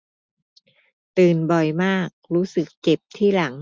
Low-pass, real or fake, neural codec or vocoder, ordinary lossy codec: none; real; none; none